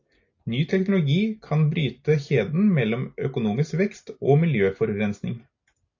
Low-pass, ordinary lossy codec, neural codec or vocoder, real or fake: 7.2 kHz; AAC, 48 kbps; none; real